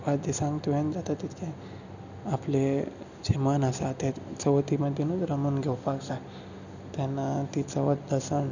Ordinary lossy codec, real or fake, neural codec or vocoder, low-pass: none; real; none; 7.2 kHz